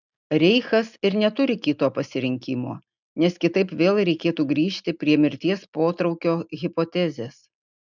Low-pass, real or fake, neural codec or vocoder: 7.2 kHz; real; none